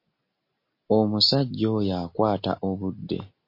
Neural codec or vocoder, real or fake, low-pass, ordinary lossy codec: none; real; 5.4 kHz; MP3, 32 kbps